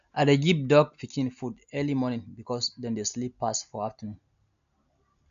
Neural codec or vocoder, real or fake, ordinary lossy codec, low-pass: none; real; MP3, 96 kbps; 7.2 kHz